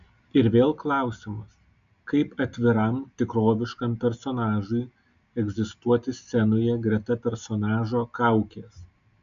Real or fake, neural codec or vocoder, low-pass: real; none; 7.2 kHz